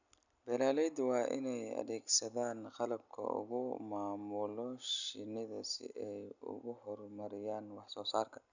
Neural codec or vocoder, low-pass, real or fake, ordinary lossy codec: none; 7.2 kHz; real; none